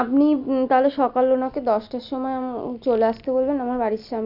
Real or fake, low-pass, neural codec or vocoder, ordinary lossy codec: real; 5.4 kHz; none; none